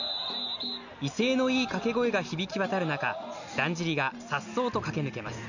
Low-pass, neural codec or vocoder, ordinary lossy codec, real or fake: 7.2 kHz; none; none; real